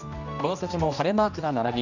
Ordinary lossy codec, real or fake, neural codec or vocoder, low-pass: none; fake; codec, 16 kHz, 1 kbps, X-Codec, HuBERT features, trained on general audio; 7.2 kHz